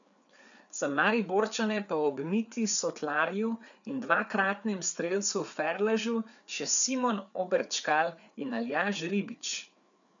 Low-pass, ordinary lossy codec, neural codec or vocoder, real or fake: 7.2 kHz; none; codec, 16 kHz, 4 kbps, FreqCodec, larger model; fake